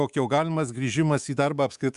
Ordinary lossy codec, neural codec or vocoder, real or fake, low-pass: Opus, 64 kbps; codec, 24 kHz, 3.1 kbps, DualCodec; fake; 10.8 kHz